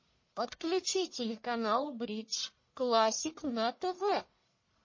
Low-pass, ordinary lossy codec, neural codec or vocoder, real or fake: 7.2 kHz; MP3, 32 kbps; codec, 44.1 kHz, 1.7 kbps, Pupu-Codec; fake